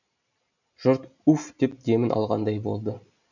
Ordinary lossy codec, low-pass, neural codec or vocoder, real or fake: none; 7.2 kHz; none; real